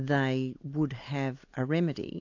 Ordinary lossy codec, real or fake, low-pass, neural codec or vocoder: AAC, 48 kbps; real; 7.2 kHz; none